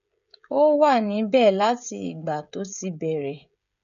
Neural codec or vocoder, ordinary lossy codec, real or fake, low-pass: codec, 16 kHz, 16 kbps, FreqCodec, smaller model; none; fake; 7.2 kHz